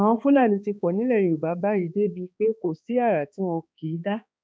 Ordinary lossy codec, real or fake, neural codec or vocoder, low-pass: none; fake; codec, 16 kHz, 2 kbps, X-Codec, HuBERT features, trained on balanced general audio; none